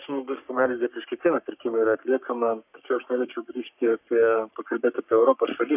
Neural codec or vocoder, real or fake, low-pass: codec, 44.1 kHz, 3.4 kbps, Pupu-Codec; fake; 3.6 kHz